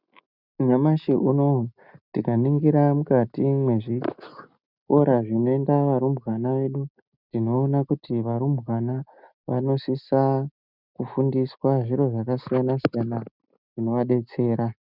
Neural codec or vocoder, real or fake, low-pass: none; real; 5.4 kHz